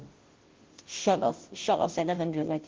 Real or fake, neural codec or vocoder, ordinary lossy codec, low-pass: fake; codec, 16 kHz, 0.5 kbps, FunCodec, trained on Chinese and English, 25 frames a second; Opus, 16 kbps; 7.2 kHz